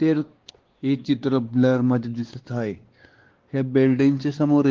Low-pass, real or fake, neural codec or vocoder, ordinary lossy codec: 7.2 kHz; fake; codec, 16 kHz, 2 kbps, X-Codec, WavLM features, trained on Multilingual LibriSpeech; Opus, 16 kbps